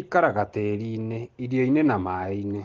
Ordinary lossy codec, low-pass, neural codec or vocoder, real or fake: Opus, 16 kbps; 7.2 kHz; none; real